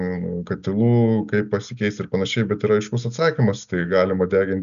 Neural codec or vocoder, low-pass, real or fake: none; 7.2 kHz; real